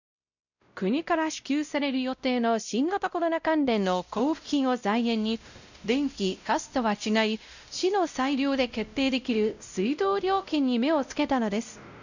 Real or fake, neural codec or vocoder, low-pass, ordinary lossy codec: fake; codec, 16 kHz, 0.5 kbps, X-Codec, WavLM features, trained on Multilingual LibriSpeech; 7.2 kHz; none